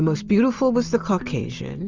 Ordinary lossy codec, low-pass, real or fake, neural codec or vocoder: Opus, 32 kbps; 7.2 kHz; real; none